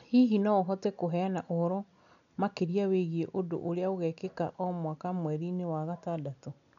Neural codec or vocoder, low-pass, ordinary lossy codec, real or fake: none; 7.2 kHz; none; real